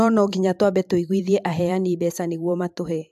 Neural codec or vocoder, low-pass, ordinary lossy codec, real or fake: vocoder, 44.1 kHz, 128 mel bands every 512 samples, BigVGAN v2; 14.4 kHz; AAC, 96 kbps; fake